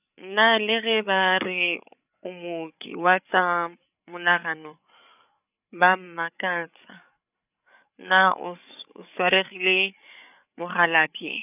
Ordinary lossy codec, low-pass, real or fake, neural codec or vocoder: none; 3.6 kHz; fake; codec, 16 kHz, 8 kbps, FreqCodec, larger model